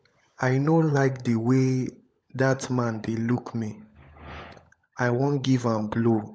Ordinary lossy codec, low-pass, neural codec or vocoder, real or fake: none; none; codec, 16 kHz, 16 kbps, FunCodec, trained on LibriTTS, 50 frames a second; fake